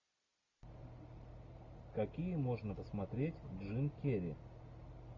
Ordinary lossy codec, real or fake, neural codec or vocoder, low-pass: MP3, 64 kbps; real; none; 7.2 kHz